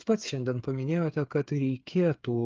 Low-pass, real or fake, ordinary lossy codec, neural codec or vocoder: 7.2 kHz; fake; Opus, 24 kbps; codec, 16 kHz, 8 kbps, FreqCodec, smaller model